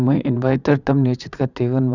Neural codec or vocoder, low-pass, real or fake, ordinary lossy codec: vocoder, 44.1 kHz, 80 mel bands, Vocos; 7.2 kHz; fake; none